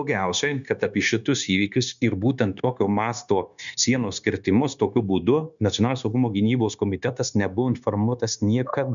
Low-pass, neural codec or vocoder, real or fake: 7.2 kHz; codec, 16 kHz, 0.9 kbps, LongCat-Audio-Codec; fake